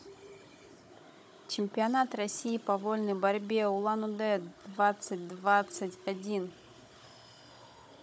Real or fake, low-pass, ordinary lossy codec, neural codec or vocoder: fake; none; none; codec, 16 kHz, 16 kbps, FunCodec, trained on Chinese and English, 50 frames a second